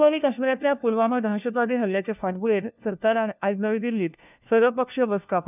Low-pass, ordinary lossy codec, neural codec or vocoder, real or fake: 3.6 kHz; none; codec, 16 kHz, 1 kbps, FunCodec, trained on LibriTTS, 50 frames a second; fake